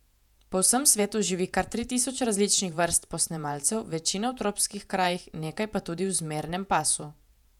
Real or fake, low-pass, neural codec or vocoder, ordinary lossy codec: fake; 19.8 kHz; vocoder, 48 kHz, 128 mel bands, Vocos; none